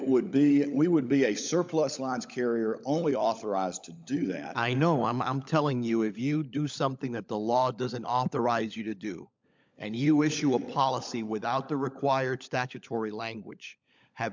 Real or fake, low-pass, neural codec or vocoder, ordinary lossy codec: fake; 7.2 kHz; codec, 16 kHz, 16 kbps, FunCodec, trained on LibriTTS, 50 frames a second; MP3, 64 kbps